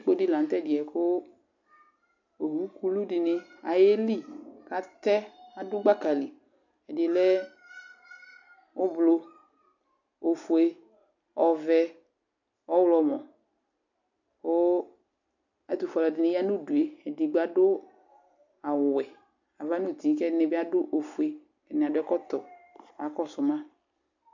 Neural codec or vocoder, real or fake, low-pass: none; real; 7.2 kHz